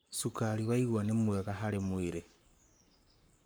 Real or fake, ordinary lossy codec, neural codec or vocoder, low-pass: fake; none; codec, 44.1 kHz, 7.8 kbps, Pupu-Codec; none